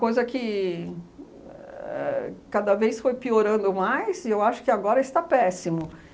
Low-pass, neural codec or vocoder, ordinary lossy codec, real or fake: none; none; none; real